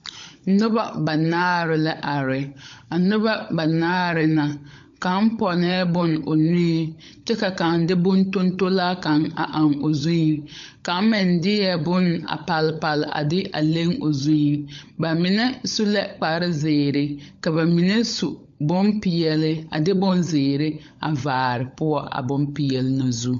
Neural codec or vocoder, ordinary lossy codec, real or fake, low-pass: codec, 16 kHz, 16 kbps, FunCodec, trained on LibriTTS, 50 frames a second; MP3, 48 kbps; fake; 7.2 kHz